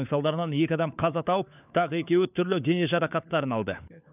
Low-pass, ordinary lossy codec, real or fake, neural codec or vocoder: 3.6 kHz; none; fake; codec, 16 kHz, 4 kbps, FunCodec, trained on LibriTTS, 50 frames a second